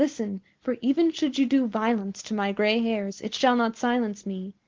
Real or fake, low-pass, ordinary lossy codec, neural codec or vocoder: real; 7.2 kHz; Opus, 16 kbps; none